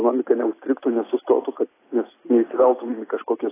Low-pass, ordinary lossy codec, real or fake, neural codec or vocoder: 3.6 kHz; AAC, 16 kbps; real; none